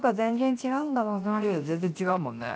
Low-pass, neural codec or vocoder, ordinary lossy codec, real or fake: none; codec, 16 kHz, 0.7 kbps, FocalCodec; none; fake